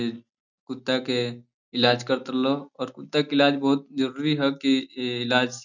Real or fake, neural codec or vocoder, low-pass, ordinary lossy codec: real; none; 7.2 kHz; none